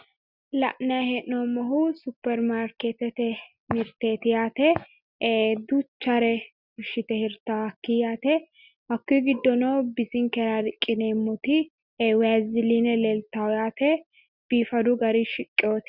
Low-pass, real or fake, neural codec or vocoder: 5.4 kHz; real; none